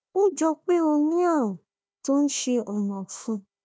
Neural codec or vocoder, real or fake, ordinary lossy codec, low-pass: codec, 16 kHz, 1 kbps, FunCodec, trained on Chinese and English, 50 frames a second; fake; none; none